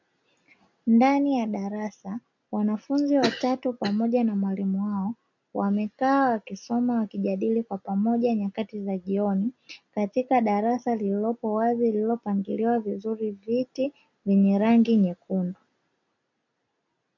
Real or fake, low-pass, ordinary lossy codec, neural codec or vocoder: real; 7.2 kHz; MP3, 64 kbps; none